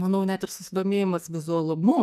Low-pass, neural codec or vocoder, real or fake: 14.4 kHz; codec, 44.1 kHz, 2.6 kbps, SNAC; fake